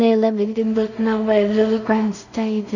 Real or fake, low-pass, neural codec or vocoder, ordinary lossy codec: fake; 7.2 kHz; codec, 16 kHz in and 24 kHz out, 0.4 kbps, LongCat-Audio-Codec, two codebook decoder; none